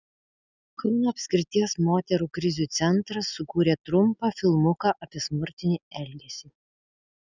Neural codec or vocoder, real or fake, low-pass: none; real; 7.2 kHz